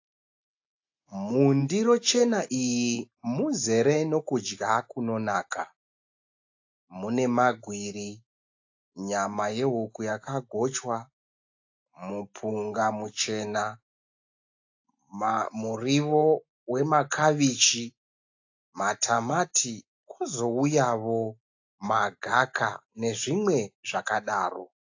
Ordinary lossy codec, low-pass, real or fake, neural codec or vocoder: AAC, 48 kbps; 7.2 kHz; real; none